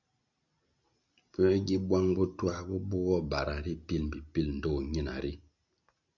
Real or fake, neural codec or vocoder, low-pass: real; none; 7.2 kHz